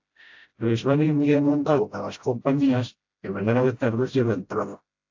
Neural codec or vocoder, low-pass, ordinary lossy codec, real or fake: codec, 16 kHz, 0.5 kbps, FreqCodec, smaller model; 7.2 kHz; AAC, 48 kbps; fake